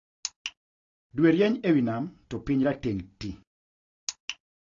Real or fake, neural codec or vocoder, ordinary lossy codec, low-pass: real; none; AAC, 32 kbps; 7.2 kHz